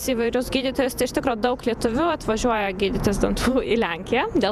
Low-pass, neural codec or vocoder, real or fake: 14.4 kHz; vocoder, 48 kHz, 128 mel bands, Vocos; fake